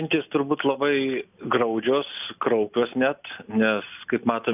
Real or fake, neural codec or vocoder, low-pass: real; none; 3.6 kHz